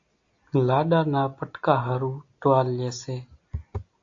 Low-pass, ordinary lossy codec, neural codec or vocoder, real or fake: 7.2 kHz; AAC, 48 kbps; none; real